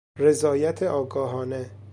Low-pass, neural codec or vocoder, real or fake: 9.9 kHz; none; real